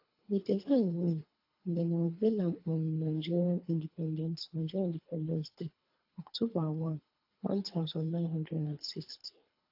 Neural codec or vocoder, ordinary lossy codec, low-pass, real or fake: codec, 24 kHz, 3 kbps, HILCodec; AAC, 48 kbps; 5.4 kHz; fake